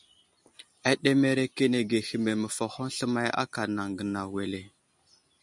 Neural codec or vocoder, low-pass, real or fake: none; 10.8 kHz; real